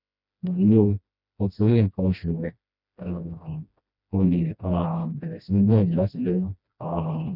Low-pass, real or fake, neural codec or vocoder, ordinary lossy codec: 5.4 kHz; fake; codec, 16 kHz, 1 kbps, FreqCodec, smaller model; none